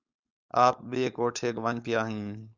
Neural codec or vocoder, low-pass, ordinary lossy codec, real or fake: codec, 16 kHz, 4.8 kbps, FACodec; 7.2 kHz; Opus, 64 kbps; fake